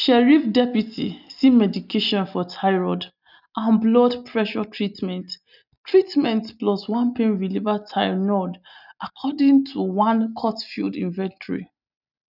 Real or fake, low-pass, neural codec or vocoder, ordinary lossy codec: real; 5.4 kHz; none; none